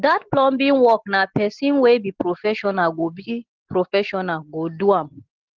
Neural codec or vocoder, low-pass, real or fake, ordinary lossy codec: none; 7.2 kHz; real; Opus, 16 kbps